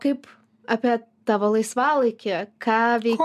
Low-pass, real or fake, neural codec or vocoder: 14.4 kHz; real; none